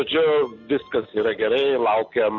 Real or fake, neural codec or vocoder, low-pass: real; none; 7.2 kHz